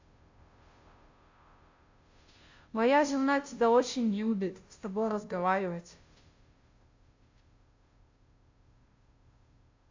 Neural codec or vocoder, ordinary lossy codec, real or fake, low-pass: codec, 16 kHz, 0.5 kbps, FunCodec, trained on Chinese and English, 25 frames a second; AAC, 48 kbps; fake; 7.2 kHz